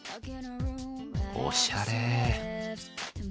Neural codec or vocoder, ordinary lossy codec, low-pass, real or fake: none; none; none; real